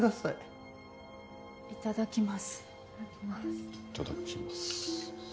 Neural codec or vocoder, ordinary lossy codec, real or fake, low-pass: none; none; real; none